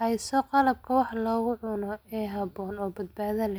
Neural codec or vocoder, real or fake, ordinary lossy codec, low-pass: none; real; none; none